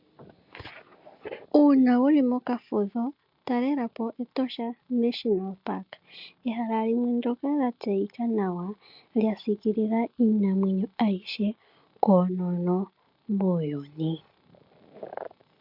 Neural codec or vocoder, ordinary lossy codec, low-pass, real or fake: none; MP3, 48 kbps; 5.4 kHz; real